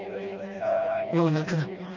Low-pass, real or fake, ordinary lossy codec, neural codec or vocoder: 7.2 kHz; fake; MP3, 48 kbps; codec, 16 kHz, 1 kbps, FreqCodec, smaller model